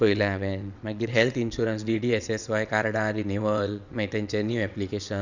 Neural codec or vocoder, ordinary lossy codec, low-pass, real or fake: vocoder, 22.05 kHz, 80 mel bands, WaveNeXt; none; 7.2 kHz; fake